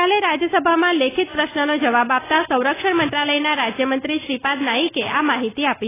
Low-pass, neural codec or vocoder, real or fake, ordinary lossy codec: 3.6 kHz; none; real; AAC, 16 kbps